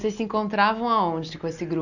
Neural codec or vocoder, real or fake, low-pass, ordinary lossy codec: none; real; 7.2 kHz; none